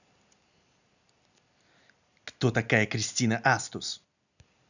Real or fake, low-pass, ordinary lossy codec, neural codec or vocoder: real; 7.2 kHz; none; none